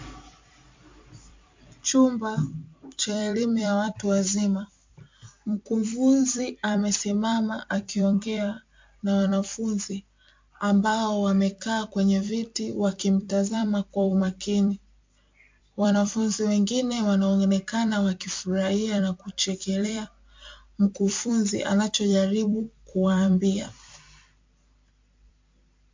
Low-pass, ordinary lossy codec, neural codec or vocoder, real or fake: 7.2 kHz; MP3, 48 kbps; vocoder, 44.1 kHz, 128 mel bands every 256 samples, BigVGAN v2; fake